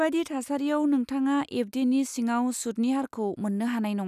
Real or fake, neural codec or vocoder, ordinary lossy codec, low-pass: real; none; none; 14.4 kHz